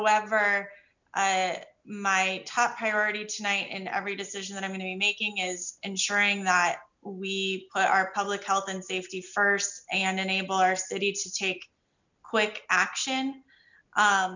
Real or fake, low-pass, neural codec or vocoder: real; 7.2 kHz; none